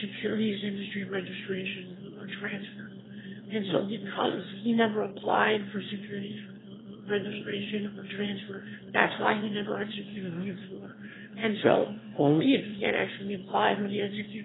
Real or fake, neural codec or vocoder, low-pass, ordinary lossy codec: fake; autoencoder, 22.05 kHz, a latent of 192 numbers a frame, VITS, trained on one speaker; 7.2 kHz; AAC, 16 kbps